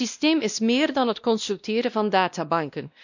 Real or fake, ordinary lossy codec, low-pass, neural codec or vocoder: fake; none; 7.2 kHz; codec, 16 kHz, 1 kbps, X-Codec, WavLM features, trained on Multilingual LibriSpeech